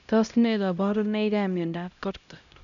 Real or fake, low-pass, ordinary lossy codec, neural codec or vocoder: fake; 7.2 kHz; none; codec, 16 kHz, 0.5 kbps, X-Codec, HuBERT features, trained on LibriSpeech